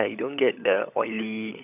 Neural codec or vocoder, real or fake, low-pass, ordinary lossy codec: codec, 16 kHz, 16 kbps, FunCodec, trained on LibriTTS, 50 frames a second; fake; 3.6 kHz; none